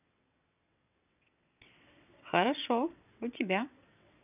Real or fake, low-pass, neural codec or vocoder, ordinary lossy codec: fake; 3.6 kHz; vocoder, 22.05 kHz, 80 mel bands, WaveNeXt; none